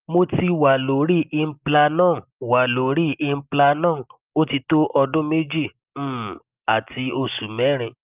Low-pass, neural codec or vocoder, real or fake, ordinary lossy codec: 3.6 kHz; none; real; Opus, 32 kbps